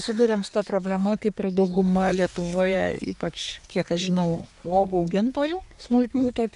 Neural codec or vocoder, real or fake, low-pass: codec, 24 kHz, 1 kbps, SNAC; fake; 10.8 kHz